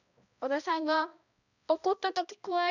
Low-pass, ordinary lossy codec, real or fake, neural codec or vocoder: 7.2 kHz; none; fake; codec, 16 kHz, 1 kbps, X-Codec, HuBERT features, trained on balanced general audio